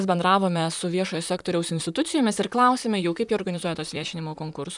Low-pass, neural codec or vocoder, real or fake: 10.8 kHz; vocoder, 44.1 kHz, 128 mel bands, Pupu-Vocoder; fake